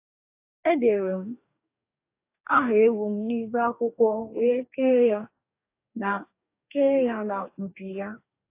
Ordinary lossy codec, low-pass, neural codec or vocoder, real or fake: AAC, 24 kbps; 3.6 kHz; codec, 44.1 kHz, 2.6 kbps, DAC; fake